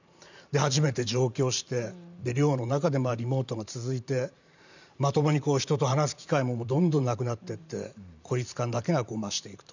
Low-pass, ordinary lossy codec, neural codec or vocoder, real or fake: 7.2 kHz; none; vocoder, 44.1 kHz, 128 mel bands every 512 samples, BigVGAN v2; fake